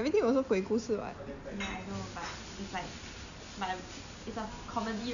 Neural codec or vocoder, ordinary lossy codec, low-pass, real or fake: none; none; 7.2 kHz; real